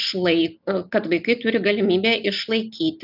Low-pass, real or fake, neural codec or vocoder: 5.4 kHz; real; none